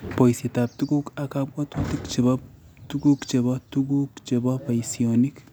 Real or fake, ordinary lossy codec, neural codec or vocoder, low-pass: real; none; none; none